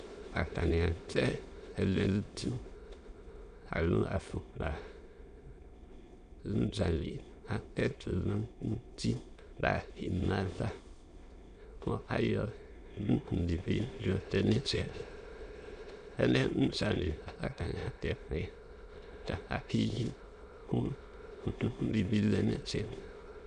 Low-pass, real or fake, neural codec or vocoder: 9.9 kHz; fake; autoencoder, 22.05 kHz, a latent of 192 numbers a frame, VITS, trained on many speakers